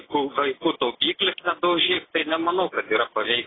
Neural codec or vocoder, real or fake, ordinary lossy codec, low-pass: none; real; AAC, 16 kbps; 7.2 kHz